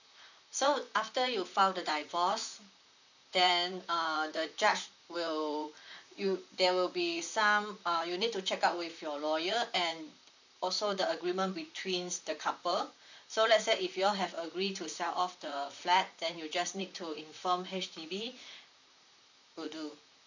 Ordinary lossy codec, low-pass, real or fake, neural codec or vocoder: none; 7.2 kHz; fake; vocoder, 44.1 kHz, 128 mel bands, Pupu-Vocoder